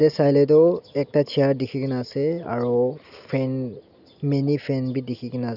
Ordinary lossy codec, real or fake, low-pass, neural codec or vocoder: none; real; 5.4 kHz; none